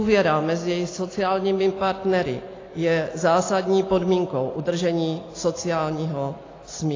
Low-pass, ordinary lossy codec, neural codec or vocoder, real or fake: 7.2 kHz; AAC, 32 kbps; none; real